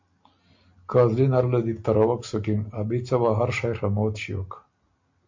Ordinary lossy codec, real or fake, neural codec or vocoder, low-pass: MP3, 48 kbps; real; none; 7.2 kHz